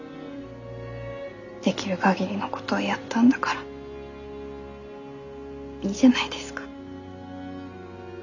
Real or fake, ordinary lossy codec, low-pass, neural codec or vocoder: real; none; 7.2 kHz; none